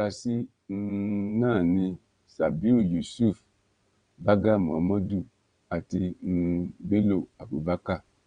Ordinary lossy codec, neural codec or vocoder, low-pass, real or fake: Opus, 64 kbps; vocoder, 22.05 kHz, 80 mel bands, WaveNeXt; 9.9 kHz; fake